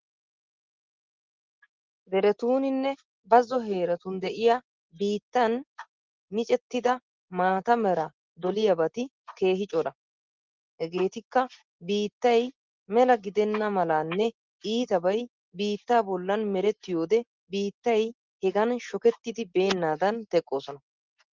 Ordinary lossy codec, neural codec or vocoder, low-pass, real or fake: Opus, 16 kbps; none; 7.2 kHz; real